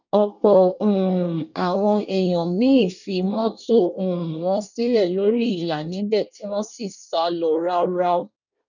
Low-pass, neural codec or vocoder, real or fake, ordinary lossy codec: 7.2 kHz; codec, 24 kHz, 1 kbps, SNAC; fake; none